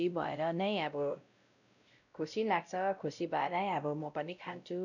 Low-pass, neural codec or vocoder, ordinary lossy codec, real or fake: 7.2 kHz; codec, 16 kHz, 0.5 kbps, X-Codec, WavLM features, trained on Multilingual LibriSpeech; none; fake